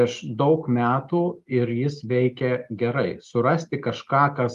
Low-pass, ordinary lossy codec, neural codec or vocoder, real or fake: 14.4 kHz; Opus, 32 kbps; none; real